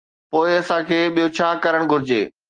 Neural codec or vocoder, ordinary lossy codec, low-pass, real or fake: none; Opus, 24 kbps; 9.9 kHz; real